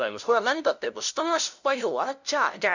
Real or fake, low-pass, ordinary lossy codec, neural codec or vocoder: fake; 7.2 kHz; none; codec, 16 kHz, 0.5 kbps, FunCodec, trained on LibriTTS, 25 frames a second